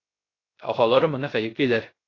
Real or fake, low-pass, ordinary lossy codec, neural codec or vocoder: fake; 7.2 kHz; AAC, 32 kbps; codec, 16 kHz, 0.3 kbps, FocalCodec